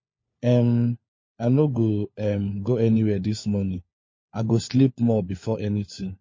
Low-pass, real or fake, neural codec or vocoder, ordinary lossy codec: 7.2 kHz; fake; codec, 16 kHz, 4 kbps, FunCodec, trained on LibriTTS, 50 frames a second; MP3, 32 kbps